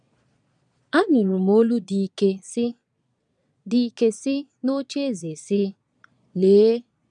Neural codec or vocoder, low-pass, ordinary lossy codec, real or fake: vocoder, 22.05 kHz, 80 mel bands, Vocos; 9.9 kHz; none; fake